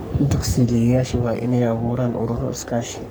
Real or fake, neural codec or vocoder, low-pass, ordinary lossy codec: fake; codec, 44.1 kHz, 3.4 kbps, Pupu-Codec; none; none